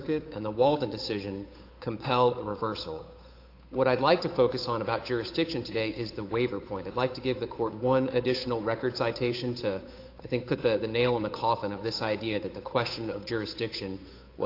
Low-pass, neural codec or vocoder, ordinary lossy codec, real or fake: 5.4 kHz; codec, 16 kHz, 8 kbps, FunCodec, trained on Chinese and English, 25 frames a second; AAC, 32 kbps; fake